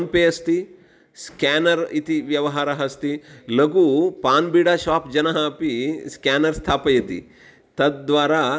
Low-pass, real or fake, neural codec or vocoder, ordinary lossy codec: none; real; none; none